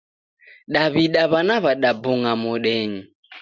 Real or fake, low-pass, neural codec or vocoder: real; 7.2 kHz; none